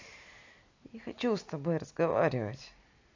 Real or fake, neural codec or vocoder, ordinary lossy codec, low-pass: real; none; AAC, 48 kbps; 7.2 kHz